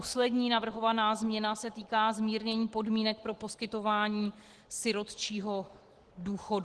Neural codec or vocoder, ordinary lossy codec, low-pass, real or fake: none; Opus, 16 kbps; 10.8 kHz; real